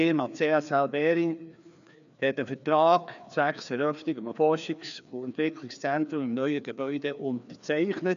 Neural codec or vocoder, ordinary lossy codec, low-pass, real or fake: codec, 16 kHz, 2 kbps, FreqCodec, larger model; none; 7.2 kHz; fake